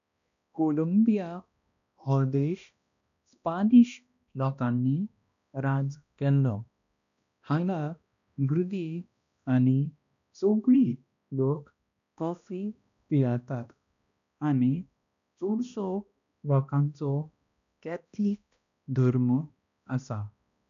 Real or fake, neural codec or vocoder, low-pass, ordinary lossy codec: fake; codec, 16 kHz, 1 kbps, X-Codec, HuBERT features, trained on balanced general audio; 7.2 kHz; none